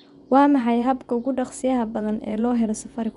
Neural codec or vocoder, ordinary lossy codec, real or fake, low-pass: vocoder, 24 kHz, 100 mel bands, Vocos; none; fake; 10.8 kHz